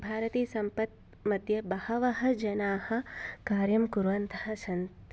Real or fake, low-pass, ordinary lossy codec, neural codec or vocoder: real; none; none; none